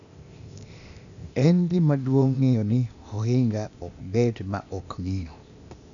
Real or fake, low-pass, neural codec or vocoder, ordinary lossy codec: fake; 7.2 kHz; codec, 16 kHz, 0.8 kbps, ZipCodec; none